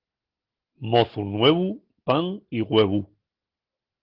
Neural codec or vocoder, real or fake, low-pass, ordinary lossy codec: none; real; 5.4 kHz; Opus, 16 kbps